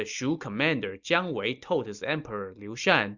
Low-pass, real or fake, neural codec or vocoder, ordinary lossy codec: 7.2 kHz; real; none; Opus, 64 kbps